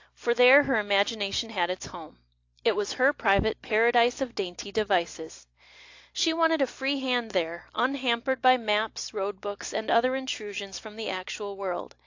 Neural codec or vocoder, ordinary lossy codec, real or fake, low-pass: none; AAC, 48 kbps; real; 7.2 kHz